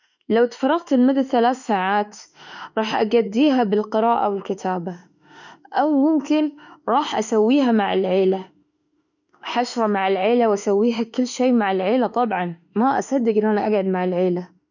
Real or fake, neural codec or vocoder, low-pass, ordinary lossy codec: fake; autoencoder, 48 kHz, 32 numbers a frame, DAC-VAE, trained on Japanese speech; 7.2 kHz; none